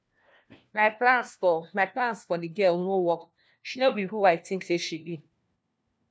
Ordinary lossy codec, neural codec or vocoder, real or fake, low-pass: none; codec, 16 kHz, 1 kbps, FunCodec, trained on LibriTTS, 50 frames a second; fake; none